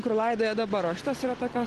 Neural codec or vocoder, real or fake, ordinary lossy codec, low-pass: none; real; Opus, 16 kbps; 10.8 kHz